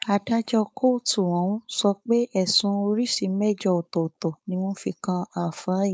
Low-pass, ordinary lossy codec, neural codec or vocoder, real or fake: none; none; codec, 16 kHz, 4.8 kbps, FACodec; fake